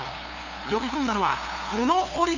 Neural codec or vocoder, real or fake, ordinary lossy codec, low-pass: codec, 16 kHz, 2 kbps, FunCodec, trained on LibriTTS, 25 frames a second; fake; none; 7.2 kHz